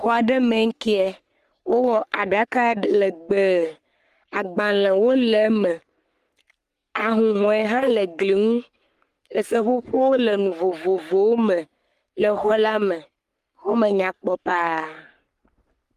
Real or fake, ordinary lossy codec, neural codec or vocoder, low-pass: fake; Opus, 32 kbps; codec, 44.1 kHz, 3.4 kbps, Pupu-Codec; 14.4 kHz